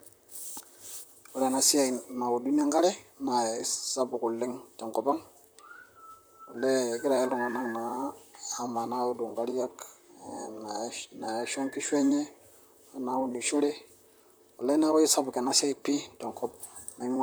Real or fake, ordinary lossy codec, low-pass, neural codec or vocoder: fake; none; none; vocoder, 44.1 kHz, 128 mel bands, Pupu-Vocoder